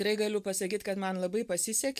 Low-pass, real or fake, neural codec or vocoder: 14.4 kHz; real; none